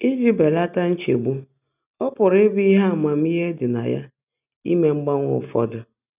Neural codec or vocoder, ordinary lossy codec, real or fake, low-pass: none; none; real; 3.6 kHz